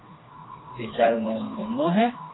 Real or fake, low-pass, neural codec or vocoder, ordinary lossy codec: fake; 7.2 kHz; codec, 16 kHz, 2 kbps, FreqCodec, smaller model; AAC, 16 kbps